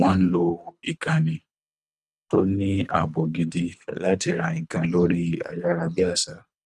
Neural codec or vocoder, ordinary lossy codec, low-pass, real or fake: codec, 24 kHz, 3 kbps, HILCodec; none; none; fake